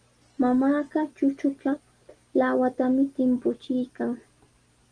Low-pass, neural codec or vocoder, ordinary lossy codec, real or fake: 9.9 kHz; none; Opus, 24 kbps; real